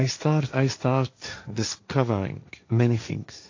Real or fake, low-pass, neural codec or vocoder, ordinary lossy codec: fake; 7.2 kHz; codec, 16 kHz, 1.1 kbps, Voila-Tokenizer; AAC, 48 kbps